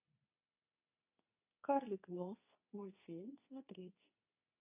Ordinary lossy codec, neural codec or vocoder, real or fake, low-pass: none; codec, 24 kHz, 0.9 kbps, WavTokenizer, medium speech release version 2; fake; 3.6 kHz